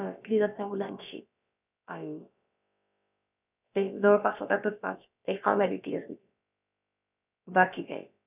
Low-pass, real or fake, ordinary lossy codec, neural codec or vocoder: 3.6 kHz; fake; none; codec, 16 kHz, about 1 kbps, DyCAST, with the encoder's durations